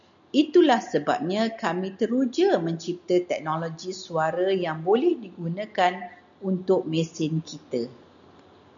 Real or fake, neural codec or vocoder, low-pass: real; none; 7.2 kHz